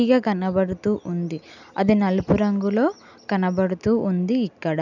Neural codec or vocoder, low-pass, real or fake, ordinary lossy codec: none; 7.2 kHz; real; none